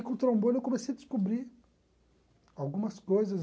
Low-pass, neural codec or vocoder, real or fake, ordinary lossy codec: none; none; real; none